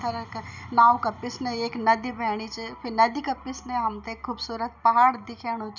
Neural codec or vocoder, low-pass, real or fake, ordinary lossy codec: none; 7.2 kHz; real; none